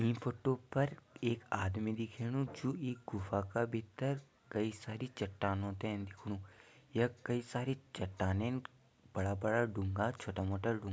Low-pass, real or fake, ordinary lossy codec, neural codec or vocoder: none; real; none; none